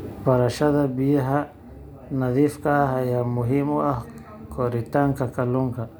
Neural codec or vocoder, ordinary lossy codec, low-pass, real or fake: none; none; none; real